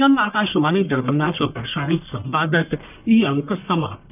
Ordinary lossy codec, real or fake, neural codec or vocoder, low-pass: none; fake; codec, 44.1 kHz, 1.7 kbps, Pupu-Codec; 3.6 kHz